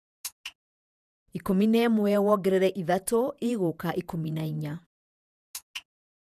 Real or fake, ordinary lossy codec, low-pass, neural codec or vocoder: fake; none; 14.4 kHz; vocoder, 48 kHz, 128 mel bands, Vocos